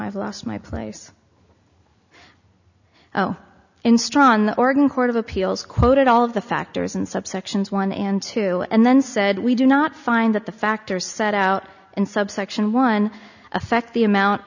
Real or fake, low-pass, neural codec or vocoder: real; 7.2 kHz; none